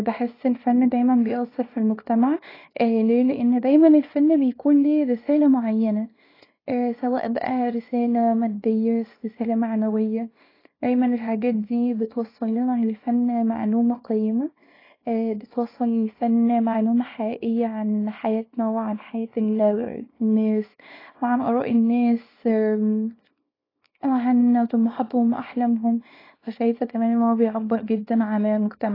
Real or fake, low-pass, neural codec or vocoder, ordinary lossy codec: fake; 5.4 kHz; codec, 24 kHz, 0.9 kbps, WavTokenizer, small release; AAC, 24 kbps